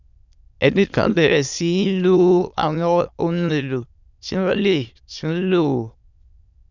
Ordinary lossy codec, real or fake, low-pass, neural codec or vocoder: none; fake; 7.2 kHz; autoencoder, 22.05 kHz, a latent of 192 numbers a frame, VITS, trained on many speakers